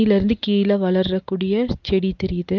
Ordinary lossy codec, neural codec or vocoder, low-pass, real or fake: Opus, 24 kbps; none; 7.2 kHz; real